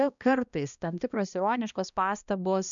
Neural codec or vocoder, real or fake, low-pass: codec, 16 kHz, 1 kbps, X-Codec, HuBERT features, trained on balanced general audio; fake; 7.2 kHz